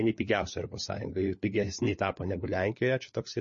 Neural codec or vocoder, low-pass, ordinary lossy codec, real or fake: codec, 16 kHz, 4 kbps, FunCodec, trained on LibriTTS, 50 frames a second; 7.2 kHz; MP3, 32 kbps; fake